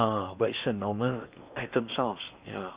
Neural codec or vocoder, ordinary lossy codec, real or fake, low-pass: codec, 16 kHz in and 24 kHz out, 0.8 kbps, FocalCodec, streaming, 65536 codes; Opus, 64 kbps; fake; 3.6 kHz